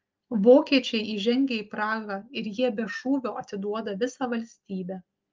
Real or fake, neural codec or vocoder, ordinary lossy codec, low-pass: real; none; Opus, 24 kbps; 7.2 kHz